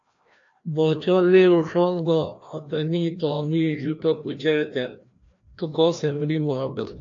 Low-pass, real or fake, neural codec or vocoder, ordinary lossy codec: 7.2 kHz; fake; codec, 16 kHz, 1 kbps, FreqCodec, larger model; AAC, 48 kbps